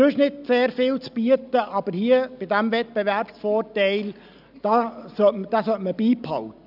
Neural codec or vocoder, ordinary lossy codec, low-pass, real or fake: none; none; 5.4 kHz; real